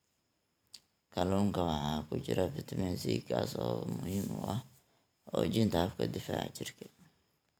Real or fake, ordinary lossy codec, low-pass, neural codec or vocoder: fake; none; none; vocoder, 44.1 kHz, 128 mel bands every 512 samples, BigVGAN v2